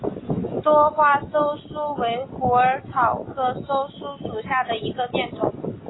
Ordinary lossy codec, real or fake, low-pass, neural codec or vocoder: AAC, 16 kbps; real; 7.2 kHz; none